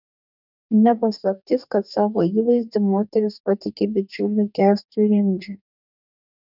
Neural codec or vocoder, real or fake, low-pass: codec, 44.1 kHz, 2.6 kbps, DAC; fake; 5.4 kHz